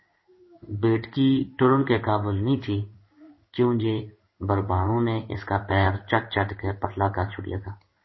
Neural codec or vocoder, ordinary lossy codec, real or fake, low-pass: codec, 16 kHz in and 24 kHz out, 1 kbps, XY-Tokenizer; MP3, 24 kbps; fake; 7.2 kHz